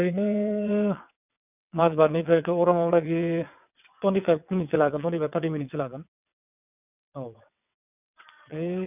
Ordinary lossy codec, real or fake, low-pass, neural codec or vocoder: none; fake; 3.6 kHz; vocoder, 22.05 kHz, 80 mel bands, WaveNeXt